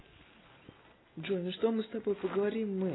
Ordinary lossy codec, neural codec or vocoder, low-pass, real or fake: AAC, 16 kbps; none; 7.2 kHz; real